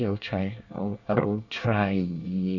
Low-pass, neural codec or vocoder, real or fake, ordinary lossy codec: 7.2 kHz; codec, 24 kHz, 1 kbps, SNAC; fake; none